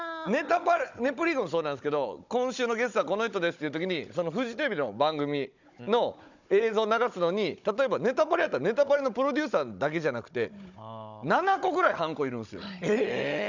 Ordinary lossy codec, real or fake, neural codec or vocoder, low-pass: none; fake; codec, 16 kHz, 16 kbps, FunCodec, trained on Chinese and English, 50 frames a second; 7.2 kHz